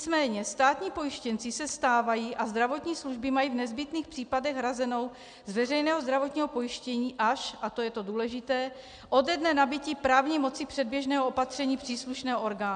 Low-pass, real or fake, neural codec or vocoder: 9.9 kHz; real; none